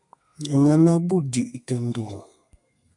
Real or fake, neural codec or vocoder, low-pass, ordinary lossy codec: fake; codec, 32 kHz, 1.9 kbps, SNAC; 10.8 kHz; MP3, 64 kbps